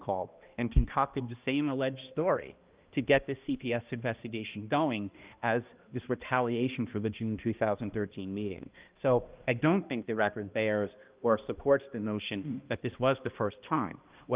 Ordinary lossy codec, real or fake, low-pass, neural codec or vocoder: Opus, 24 kbps; fake; 3.6 kHz; codec, 16 kHz, 1 kbps, X-Codec, HuBERT features, trained on balanced general audio